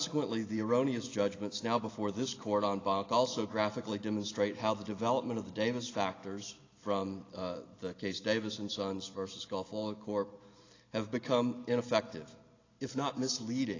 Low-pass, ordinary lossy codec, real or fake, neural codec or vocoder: 7.2 kHz; AAC, 32 kbps; real; none